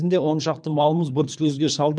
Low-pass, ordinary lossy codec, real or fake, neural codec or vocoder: 9.9 kHz; none; fake; codec, 24 kHz, 3 kbps, HILCodec